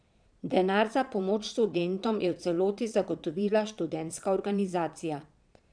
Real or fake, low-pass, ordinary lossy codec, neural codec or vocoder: fake; 9.9 kHz; none; vocoder, 22.05 kHz, 80 mel bands, Vocos